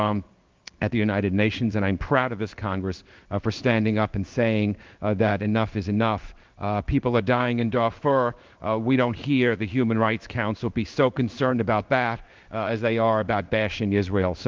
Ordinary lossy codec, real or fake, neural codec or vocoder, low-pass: Opus, 24 kbps; fake; codec, 16 kHz in and 24 kHz out, 1 kbps, XY-Tokenizer; 7.2 kHz